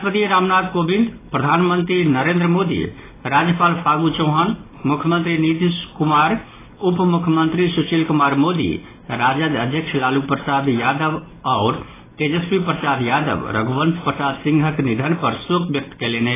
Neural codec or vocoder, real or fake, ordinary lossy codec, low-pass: none; real; AAC, 16 kbps; 3.6 kHz